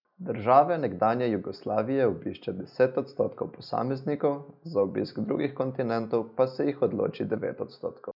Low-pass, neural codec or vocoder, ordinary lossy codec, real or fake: 5.4 kHz; none; none; real